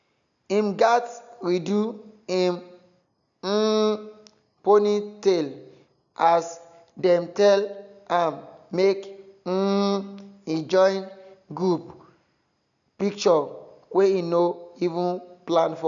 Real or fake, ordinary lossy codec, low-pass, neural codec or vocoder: real; none; 7.2 kHz; none